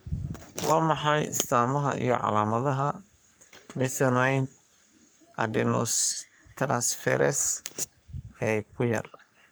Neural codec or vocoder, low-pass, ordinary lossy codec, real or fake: codec, 44.1 kHz, 3.4 kbps, Pupu-Codec; none; none; fake